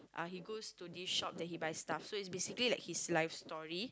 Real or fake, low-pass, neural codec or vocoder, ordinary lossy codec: real; none; none; none